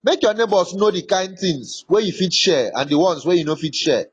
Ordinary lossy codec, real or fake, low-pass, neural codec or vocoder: AAC, 32 kbps; real; 10.8 kHz; none